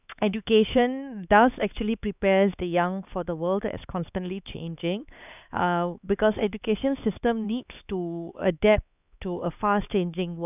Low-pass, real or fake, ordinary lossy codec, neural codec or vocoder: 3.6 kHz; fake; none; codec, 16 kHz, 4 kbps, X-Codec, HuBERT features, trained on LibriSpeech